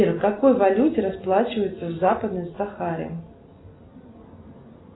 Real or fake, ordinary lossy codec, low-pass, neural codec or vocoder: real; AAC, 16 kbps; 7.2 kHz; none